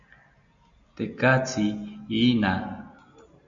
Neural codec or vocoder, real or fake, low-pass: none; real; 7.2 kHz